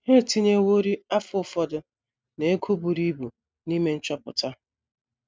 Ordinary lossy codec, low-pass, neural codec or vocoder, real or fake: none; none; none; real